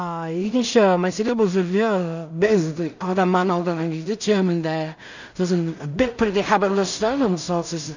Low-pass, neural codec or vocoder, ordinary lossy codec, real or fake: 7.2 kHz; codec, 16 kHz in and 24 kHz out, 0.4 kbps, LongCat-Audio-Codec, two codebook decoder; none; fake